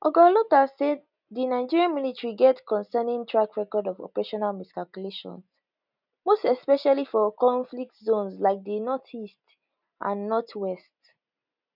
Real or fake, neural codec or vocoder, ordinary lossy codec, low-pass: real; none; none; 5.4 kHz